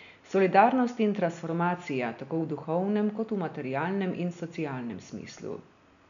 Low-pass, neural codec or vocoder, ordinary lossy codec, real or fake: 7.2 kHz; none; none; real